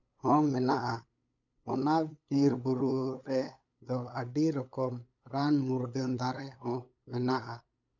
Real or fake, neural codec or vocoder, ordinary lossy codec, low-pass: fake; codec, 16 kHz, 8 kbps, FunCodec, trained on LibriTTS, 25 frames a second; none; 7.2 kHz